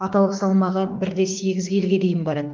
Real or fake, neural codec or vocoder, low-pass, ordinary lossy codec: fake; autoencoder, 48 kHz, 32 numbers a frame, DAC-VAE, trained on Japanese speech; 7.2 kHz; Opus, 32 kbps